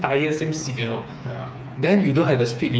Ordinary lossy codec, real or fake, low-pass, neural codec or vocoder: none; fake; none; codec, 16 kHz, 4 kbps, FreqCodec, smaller model